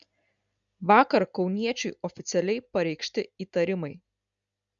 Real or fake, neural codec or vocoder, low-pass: real; none; 7.2 kHz